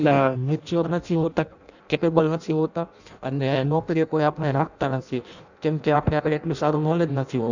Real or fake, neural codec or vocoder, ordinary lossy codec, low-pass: fake; codec, 16 kHz in and 24 kHz out, 0.6 kbps, FireRedTTS-2 codec; none; 7.2 kHz